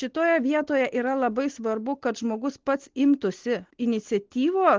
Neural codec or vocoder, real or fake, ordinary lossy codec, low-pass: none; real; Opus, 16 kbps; 7.2 kHz